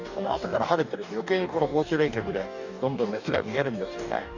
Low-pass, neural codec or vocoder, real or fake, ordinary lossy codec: 7.2 kHz; codec, 44.1 kHz, 2.6 kbps, DAC; fake; none